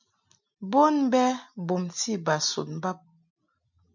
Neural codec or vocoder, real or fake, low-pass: none; real; 7.2 kHz